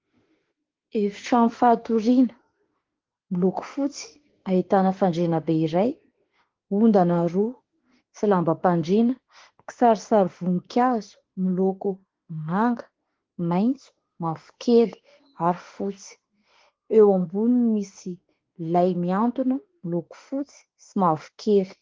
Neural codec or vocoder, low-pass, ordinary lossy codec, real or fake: autoencoder, 48 kHz, 32 numbers a frame, DAC-VAE, trained on Japanese speech; 7.2 kHz; Opus, 16 kbps; fake